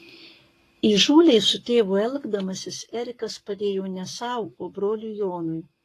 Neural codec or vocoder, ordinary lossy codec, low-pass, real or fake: codec, 44.1 kHz, 7.8 kbps, Pupu-Codec; AAC, 48 kbps; 14.4 kHz; fake